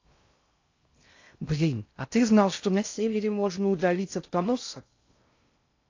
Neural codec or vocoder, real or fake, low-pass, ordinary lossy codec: codec, 16 kHz in and 24 kHz out, 0.6 kbps, FocalCodec, streaming, 2048 codes; fake; 7.2 kHz; AAC, 48 kbps